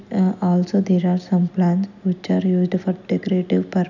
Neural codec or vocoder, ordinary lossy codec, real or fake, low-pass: none; none; real; 7.2 kHz